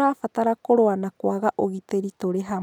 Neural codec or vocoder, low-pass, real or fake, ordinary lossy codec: none; 19.8 kHz; real; none